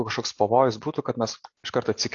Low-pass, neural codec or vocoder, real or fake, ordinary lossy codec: 7.2 kHz; none; real; AAC, 48 kbps